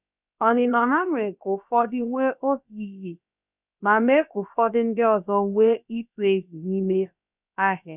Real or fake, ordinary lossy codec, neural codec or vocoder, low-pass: fake; none; codec, 16 kHz, about 1 kbps, DyCAST, with the encoder's durations; 3.6 kHz